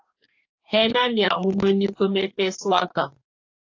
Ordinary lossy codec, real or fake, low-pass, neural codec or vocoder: AAC, 48 kbps; fake; 7.2 kHz; codec, 44.1 kHz, 2.6 kbps, DAC